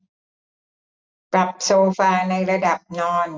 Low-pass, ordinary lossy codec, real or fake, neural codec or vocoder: none; none; real; none